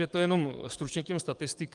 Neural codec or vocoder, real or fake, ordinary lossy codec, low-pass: codec, 44.1 kHz, 7.8 kbps, DAC; fake; Opus, 32 kbps; 10.8 kHz